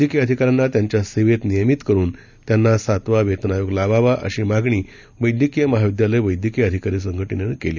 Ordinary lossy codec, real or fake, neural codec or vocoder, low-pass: none; real; none; 7.2 kHz